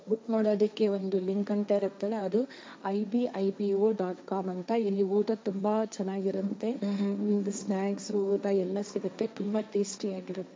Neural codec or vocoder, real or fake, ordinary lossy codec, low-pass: codec, 16 kHz, 1.1 kbps, Voila-Tokenizer; fake; none; none